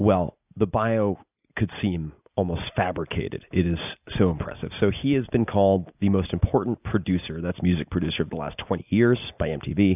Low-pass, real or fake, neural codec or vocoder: 3.6 kHz; real; none